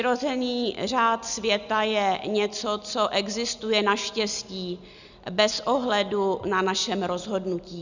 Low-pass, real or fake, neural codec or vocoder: 7.2 kHz; real; none